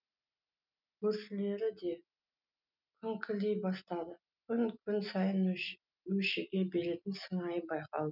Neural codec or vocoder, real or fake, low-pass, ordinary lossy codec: none; real; 5.4 kHz; none